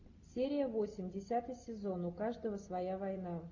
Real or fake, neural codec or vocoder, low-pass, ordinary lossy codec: real; none; 7.2 kHz; AAC, 48 kbps